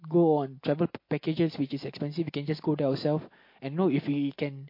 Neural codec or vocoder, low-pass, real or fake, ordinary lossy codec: none; 5.4 kHz; real; MP3, 32 kbps